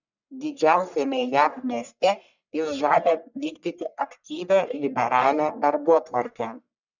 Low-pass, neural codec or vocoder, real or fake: 7.2 kHz; codec, 44.1 kHz, 1.7 kbps, Pupu-Codec; fake